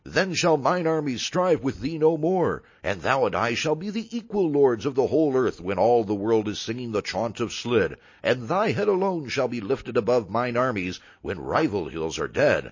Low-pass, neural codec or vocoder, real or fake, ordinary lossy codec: 7.2 kHz; none; real; MP3, 32 kbps